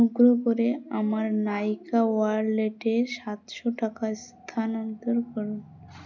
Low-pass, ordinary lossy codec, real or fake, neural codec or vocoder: 7.2 kHz; none; real; none